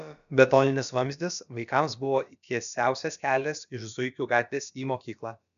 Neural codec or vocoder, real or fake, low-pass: codec, 16 kHz, about 1 kbps, DyCAST, with the encoder's durations; fake; 7.2 kHz